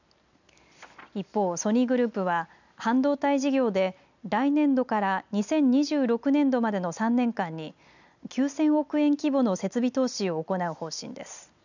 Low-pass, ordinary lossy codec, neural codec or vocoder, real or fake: 7.2 kHz; none; none; real